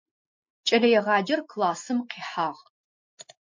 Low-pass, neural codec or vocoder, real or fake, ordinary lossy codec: 7.2 kHz; none; real; MP3, 48 kbps